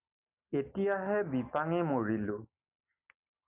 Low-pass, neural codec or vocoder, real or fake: 3.6 kHz; none; real